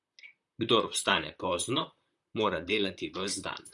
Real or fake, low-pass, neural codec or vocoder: fake; 10.8 kHz; vocoder, 44.1 kHz, 128 mel bands, Pupu-Vocoder